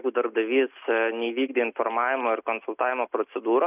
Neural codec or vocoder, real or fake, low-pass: none; real; 3.6 kHz